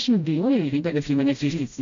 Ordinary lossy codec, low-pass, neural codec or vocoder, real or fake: MP3, 64 kbps; 7.2 kHz; codec, 16 kHz, 0.5 kbps, FreqCodec, smaller model; fake